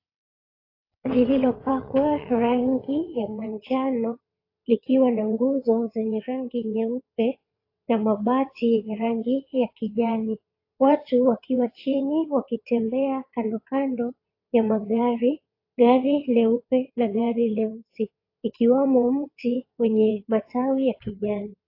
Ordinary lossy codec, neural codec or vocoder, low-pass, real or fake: AAC, 32 kbps; vocoder, 22.05 kHz, 80 mel bands, WaveNeXt; 5.4 kHz; fake